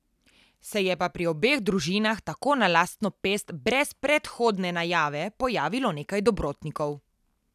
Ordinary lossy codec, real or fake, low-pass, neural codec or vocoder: none; real; 14.4 kHz; none